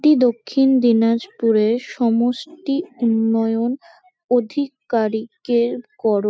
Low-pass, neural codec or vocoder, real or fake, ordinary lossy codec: none; none; real; none